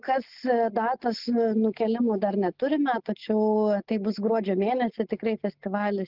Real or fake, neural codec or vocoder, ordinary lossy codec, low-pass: real; none; Opus, 32 kbps; 5.4 kHz